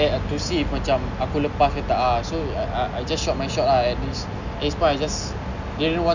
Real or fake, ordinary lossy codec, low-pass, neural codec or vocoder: real; none; 7.2 kHz; none